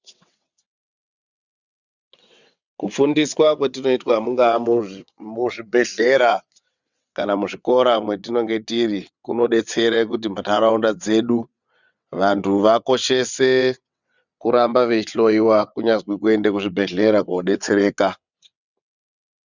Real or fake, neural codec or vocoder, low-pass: fake; vocoder, 22.05 kHz, 80 mel bands, WaveNeXt; 7.2 kHz